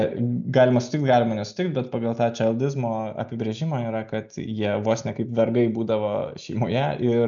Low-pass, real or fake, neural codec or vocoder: 7.2 kHz; real; none